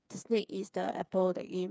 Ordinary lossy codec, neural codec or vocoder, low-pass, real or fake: none; codec, 16 kHz, 4 kbps, FreqCodec, smaller model; none; fake